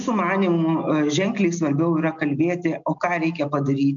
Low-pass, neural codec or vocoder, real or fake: 7.2 kHz; none; real